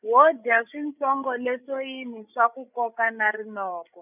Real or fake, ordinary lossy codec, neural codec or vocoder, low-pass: fake; none; codec, 16 kHz, 8 kbps, FreqCodec, larger model; 3.6 kHz